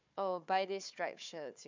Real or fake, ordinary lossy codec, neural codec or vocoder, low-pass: fake; MP3, 64 kbps; codec, 16 kHz, 4 kbps, FunCodec, trained on Chinese and English, 50 frames a second; 7.2 kHz